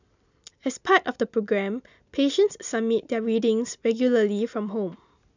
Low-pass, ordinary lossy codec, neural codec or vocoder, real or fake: 7.2 kHz; none; none; real